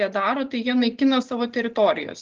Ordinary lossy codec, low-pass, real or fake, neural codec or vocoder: Opus, 32 kbps; 7.2 kHz; real; none